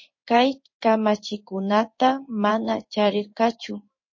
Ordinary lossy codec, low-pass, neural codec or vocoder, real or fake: MP3, 32 kbps; 7.2 kHz; codec, 16 kHz in and 24 kHz out, 1 kbps, XY-Tokenizer; fake